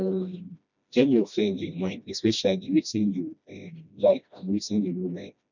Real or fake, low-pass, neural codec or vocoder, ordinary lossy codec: fake; 7.2 kHz; codec, 16 kHz, 1 kbps, FreqCodec, smaller model; none